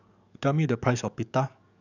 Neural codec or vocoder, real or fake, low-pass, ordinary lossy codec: codec, 44.1 kHz, 7.8 kbps, DAC; fake; 7.2 kHz; none